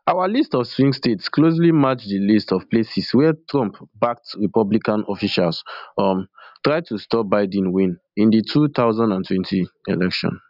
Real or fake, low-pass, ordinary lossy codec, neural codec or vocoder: real; 5.4 kHz; none; none